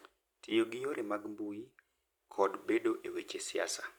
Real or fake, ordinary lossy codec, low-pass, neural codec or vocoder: fake; none; 19.8 kHz; vocoder, 44.1 kHz, 128 mel bands every 256 samples, BigVGAN v2